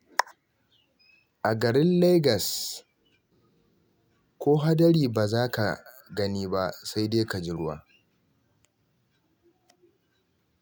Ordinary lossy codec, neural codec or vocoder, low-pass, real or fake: none; none; none; real